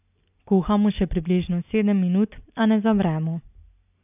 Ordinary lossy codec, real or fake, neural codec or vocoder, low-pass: none; real; none; 3.6 kHz